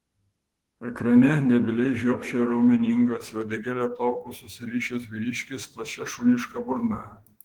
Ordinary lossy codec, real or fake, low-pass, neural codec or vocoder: Opus, 16 kbps; fake; 19.8 kHz; autoencoder, 48 kHz, 32 numbers a frame, DAC-VAE, trained on Japanese speech